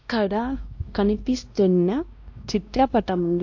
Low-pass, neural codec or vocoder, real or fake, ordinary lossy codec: 7.2 kHz; codec, 16 kHz, 1 kbps, X-Codec, WavLM features, trained on Multilingual LibriSpeech; fake; AAC, 48 kbps